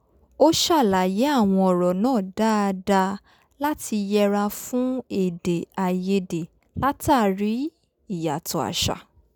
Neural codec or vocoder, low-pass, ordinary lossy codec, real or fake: none; none; none; real